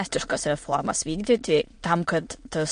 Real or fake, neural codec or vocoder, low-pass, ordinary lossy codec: fake; autoencoder, 22.05 kHz, a latent of 192 numbers a frame, VITS, trained on many speakers; 9.9 kHz; MP3, 48 kbps